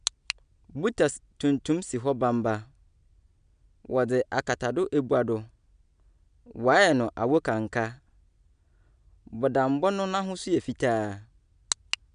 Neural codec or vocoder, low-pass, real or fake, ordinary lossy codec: none; 9.9 kHz; real; none